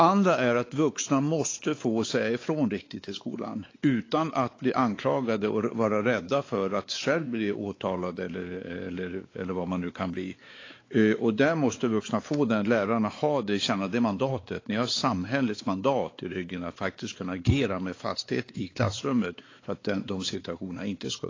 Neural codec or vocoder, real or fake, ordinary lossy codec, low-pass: codec, 16 kHz, 4 kbps, X-Codec, WavLM features, trained on Multilingual LibriSpeech; fake; AAC, 32 kbps; 7.2 kHz